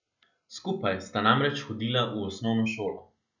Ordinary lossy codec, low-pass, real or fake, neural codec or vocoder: none; 7.2 kHz; real; none